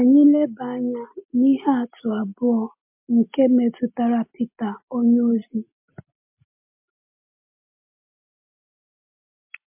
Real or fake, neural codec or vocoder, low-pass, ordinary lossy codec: real; none; 3.6 kHz; MP3, 32 kbps